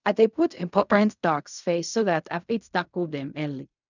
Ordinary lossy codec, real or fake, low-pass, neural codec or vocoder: none; fake; 7.2 kHz; codec, 16 kHz in and 24 kHz out, 0.4 kbps, LongCat-Audio-Codec, fine tuned four codebook decoder